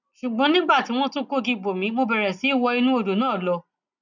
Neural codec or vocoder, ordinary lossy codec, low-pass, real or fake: none; none; 7.2 kHz; real